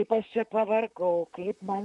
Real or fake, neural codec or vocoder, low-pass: fake; vocoder, 44.1 kHz, 128 mel bands, Pupu-Vocoder; 10.8 kHz